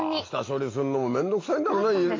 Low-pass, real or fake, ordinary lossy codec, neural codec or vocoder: 7.2 kHz; real; AAC, 32 kbps; none